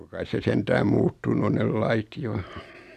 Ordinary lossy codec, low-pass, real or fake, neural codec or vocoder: none; 14.4 kHz; real; none